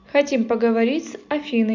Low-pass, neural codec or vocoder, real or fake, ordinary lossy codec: 7.2 kHz; none; real; none